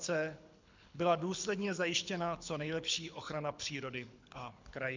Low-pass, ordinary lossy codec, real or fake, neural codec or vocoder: 7.2 kHz; MP3, 64 kbps; fake; codec, 24 kHz, 6 kbps, HILCodec